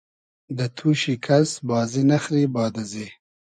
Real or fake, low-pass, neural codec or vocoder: fake; 9.9 kHz; vocoder, 24 kHz, 100 mel bands, Vocos